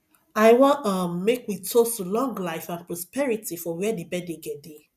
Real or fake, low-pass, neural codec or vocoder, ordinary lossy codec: real; 14.4 kHz; none; none